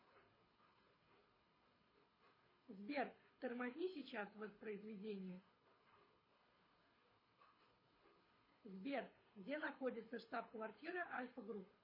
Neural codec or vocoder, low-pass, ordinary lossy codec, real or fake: codec, 24 kHz, 6 kbps, HILCodec; 5.4 kHz; MP3, 24 kbps; fake